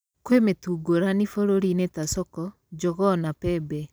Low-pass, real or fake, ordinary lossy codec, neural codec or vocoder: none; real; none; none